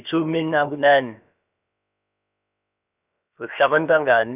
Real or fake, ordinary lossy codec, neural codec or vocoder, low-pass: fake; none; codec, 16 kHz, about 1 kbps, DyCAST, with the encoder's durations; 3.6 kHz